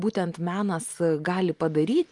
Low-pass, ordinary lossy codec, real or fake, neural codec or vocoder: 10.8 kHz; Opus, 32 kbps; real; none